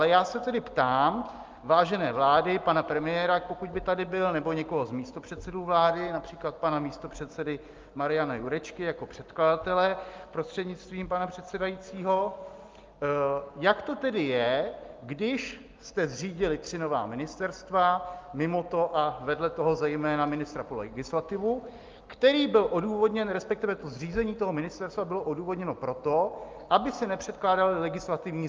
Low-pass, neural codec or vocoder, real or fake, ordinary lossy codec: 7.2 kHz; none; real; Opus, 32 kbps